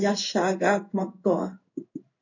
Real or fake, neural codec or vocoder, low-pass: fake; codec, 16 kHz in and 24 kHz out, 1 kbps, XY-Tokenizer; 7.2 kHz